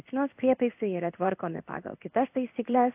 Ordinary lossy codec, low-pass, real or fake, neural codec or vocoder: AAC, 32 kbps; 3.6 kHz; fake; codec, 16 kHz in and 24 kHz out, 1 kbps, XY-Tokenizer